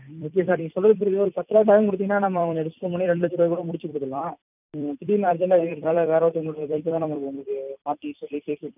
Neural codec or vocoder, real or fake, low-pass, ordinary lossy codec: vocoder, 44.1 kHz, 128 mel bands every 256 samples, BigVGAN v2; fake; 3.6 kHz; none